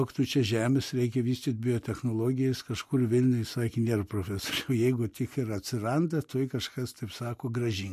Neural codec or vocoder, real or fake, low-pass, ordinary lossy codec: none; real; 14.4 kHz; MP3, 64 kbps